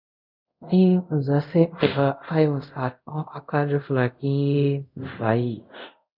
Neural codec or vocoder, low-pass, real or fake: codec, 24 kHz, 0.5 kbps, DualCodec; 5.4 kHz; fake